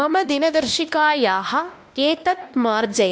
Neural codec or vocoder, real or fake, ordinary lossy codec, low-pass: codec, 16 kHz, 1 kbps, X-Codec, WavLM features, trained on Multilingual LibriSpeech; fake; none; none